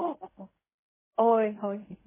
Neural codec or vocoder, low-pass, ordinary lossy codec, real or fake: codec, 16 kHz in and 24 kHz out, 0.4 kbps, LongCat-Audio-Codec, fine tuned four codebook decoder; 3.6 kHz; MP3, 16 kbps; fake